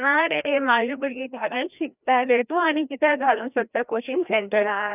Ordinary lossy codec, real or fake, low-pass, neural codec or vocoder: none; fake; 3.6 kHz; codec, 16 kHz, 1 kbps, FreqCodec, larger model